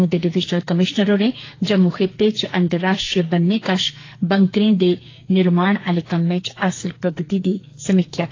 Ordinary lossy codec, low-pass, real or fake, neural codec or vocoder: AAC, 32 kbps; 7.2 kHz; fake; codec, 44.1 kHz, 2.6 kbps, SNAC